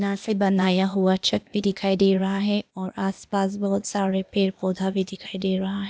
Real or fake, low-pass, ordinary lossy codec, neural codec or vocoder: fake; none; none; codec, 16 kHz, 0.8 kbps, ZipCodec